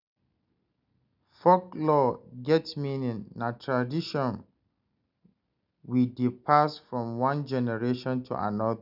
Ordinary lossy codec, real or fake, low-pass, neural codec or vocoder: none; real; 5.4 kHz; none